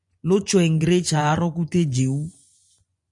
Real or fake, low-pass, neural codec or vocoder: fake; 10.8 kHz; vocoder, 24 kHz, 100 mel bands, Vocos